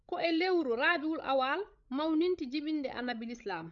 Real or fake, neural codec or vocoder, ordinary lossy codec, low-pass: fake; codec, 16 kHz, 16 kbps, FreqCodec, larger model; none; 7.2 kHz